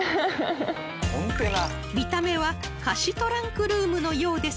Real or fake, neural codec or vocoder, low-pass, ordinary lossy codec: real; none; none; none